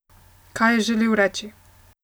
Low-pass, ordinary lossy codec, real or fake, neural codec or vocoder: none; none; real; none